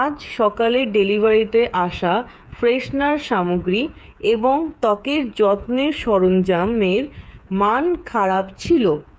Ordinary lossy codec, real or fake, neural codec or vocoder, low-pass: none; fake; codec, 16 kHz, 8 kbps, FreqCodec, smaller model; none